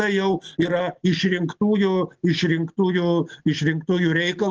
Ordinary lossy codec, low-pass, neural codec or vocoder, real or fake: Opus, 16 kbps; 7.2 kHz; none; real